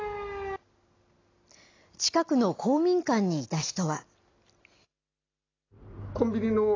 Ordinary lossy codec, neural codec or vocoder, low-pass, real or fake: AAC, 32 kbps; none; 7.2 kHz; real